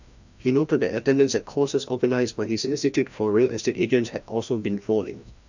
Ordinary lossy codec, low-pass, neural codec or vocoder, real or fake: none; 7.2 kHz; codec, 16 kHz, 1 kbps, FreqCodec, larger model; fake